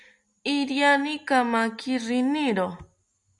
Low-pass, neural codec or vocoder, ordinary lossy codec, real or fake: 10.8 kHz; none; MP3, 96 kbps; real